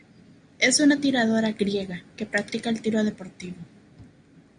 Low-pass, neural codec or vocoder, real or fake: 9.9 kHz; none; real